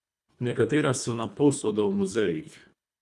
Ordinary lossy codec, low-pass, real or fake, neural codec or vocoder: none; none; fake; codec, 24 kHz, 1.5 kbps, HILCodec